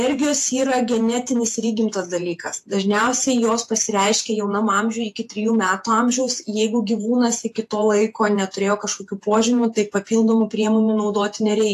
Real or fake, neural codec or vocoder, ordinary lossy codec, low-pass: fake; vocoder, 48 kHz, 128 mel bands, Vocos; AAC, 64 kbps; 14.4 kHz